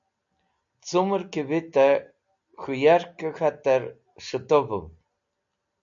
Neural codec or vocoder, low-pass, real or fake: none; 7.2 kHz; real